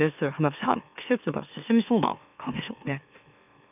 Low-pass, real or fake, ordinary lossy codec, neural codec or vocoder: 3.6 kHz; fake; none; autoencoder, 44.1 kHz, a latent of 192 numbers a frame, MeloTTS